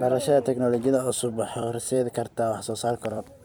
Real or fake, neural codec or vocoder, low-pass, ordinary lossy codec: fake; vocoder, 44.1 kHz, 128 mel bands every 256 samples, BigVGAN v2; none; none